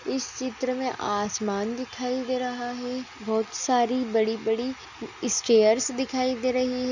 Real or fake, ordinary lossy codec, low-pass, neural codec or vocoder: real; none; 7.2 kHz; none